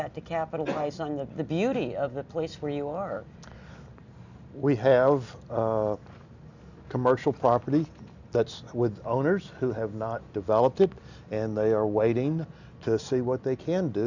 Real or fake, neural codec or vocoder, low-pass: real; none; 7.2 kHz